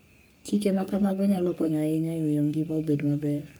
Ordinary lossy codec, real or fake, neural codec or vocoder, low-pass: none; fake; codec, 44.1 kHz, 3.4 kbps, Pupu-Codec; none